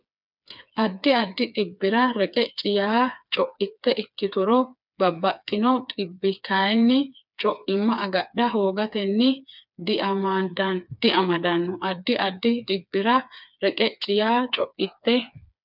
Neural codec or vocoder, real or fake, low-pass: codec, 16 kHz, 4 kbps, FreqCodec, smaller model; fake; 5.4 kHz